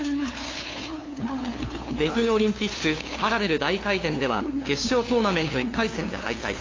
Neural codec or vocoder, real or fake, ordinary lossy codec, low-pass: codec, 16 kHz, 2 kbps, FunCodec, trained on LibriTTS, 25 frames a second; fake; AAC, 32 kbps; 7.2 kHz